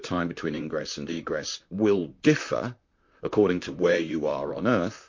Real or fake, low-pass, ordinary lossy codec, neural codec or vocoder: fake; 7.2 kHz; MP3, 48 kbps; vocoder, 44.1 kHz, 128 mel bands, Pupu-Vocoder